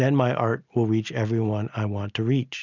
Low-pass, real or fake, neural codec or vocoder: 7.2 kHz; real; none